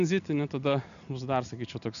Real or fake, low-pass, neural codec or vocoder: real; 7.2 kHz; none